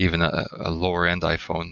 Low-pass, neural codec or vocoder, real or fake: 7.2 kHz; none; real